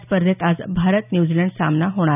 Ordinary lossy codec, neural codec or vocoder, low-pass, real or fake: none; none; 3.6 kHz; real